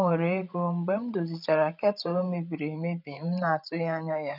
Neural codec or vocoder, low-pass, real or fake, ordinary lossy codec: codec, 16 kHz, 16 kbps, FreqCodec, larger model; 5.4 kHz; fake; none